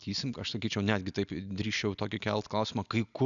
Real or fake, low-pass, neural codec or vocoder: real; 7.2 kHz; none